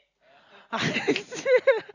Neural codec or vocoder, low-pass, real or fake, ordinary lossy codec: none; 7.2 kHz; real; none